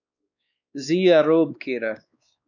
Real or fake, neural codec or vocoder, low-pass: fake; codec, 16 kHz, 2 kbps, X-Codec, WavLM features, trained on Multilingual LibriSpeech; 7.2 kHz